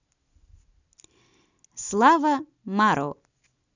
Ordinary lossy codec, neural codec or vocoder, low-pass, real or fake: none; none; 7.2 kHz; real